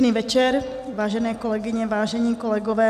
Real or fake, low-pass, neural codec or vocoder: real; 14.4 kHz; none